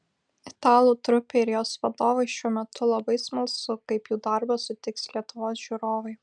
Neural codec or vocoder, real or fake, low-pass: none; real; 9.9 kHz